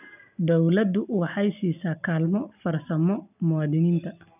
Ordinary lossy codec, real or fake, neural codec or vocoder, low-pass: none; real; none; 3.6 kHz